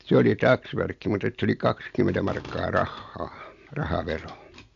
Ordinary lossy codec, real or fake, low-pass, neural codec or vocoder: AAC, 64 kbps; real; 7.2 kHz; none